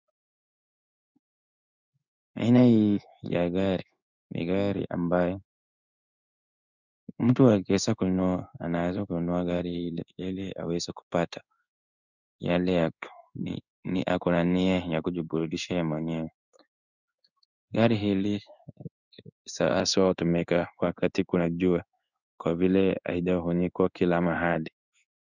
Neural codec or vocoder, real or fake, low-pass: codec, 16 kHz in and 24 kHz out, 1 kbps, XY-Tokenizer; fake; 7.2 kHz